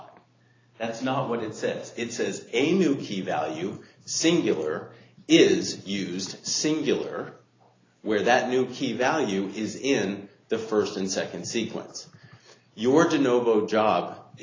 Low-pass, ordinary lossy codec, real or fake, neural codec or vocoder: 7.2 kHz; AAC, 32 kbps; real; none